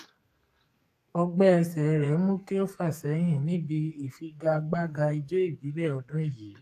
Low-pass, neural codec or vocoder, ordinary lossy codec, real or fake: 14.4 kHz; codec, 44.1 kHz, 2.6 kbps, SNAC; AAC, 64 kbps; fake